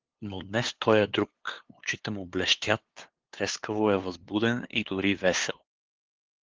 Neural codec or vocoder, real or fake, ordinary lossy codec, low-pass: codec, 16 kHz, 2 kbps, FunCodec, trained on LibriTTS, 25 frames a second; fake; Opus, 16 kbps; 7.2 kHz